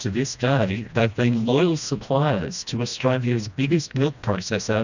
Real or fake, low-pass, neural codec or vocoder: fake; 7.2 kHz; codec, 16 kHz, 1 kbps, FreqCodec, smaller model